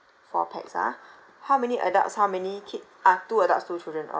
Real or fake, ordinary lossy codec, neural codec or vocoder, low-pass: real; none; none; none